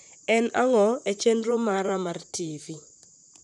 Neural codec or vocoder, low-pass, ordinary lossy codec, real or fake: vocoder, 44.1 kHz, 128 mel bands, Pupu-Vocoder; 10.8 kHz; none; fake